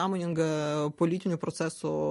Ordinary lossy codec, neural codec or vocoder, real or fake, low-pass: MP3, 48 kbps; none; real; 14.4 kHz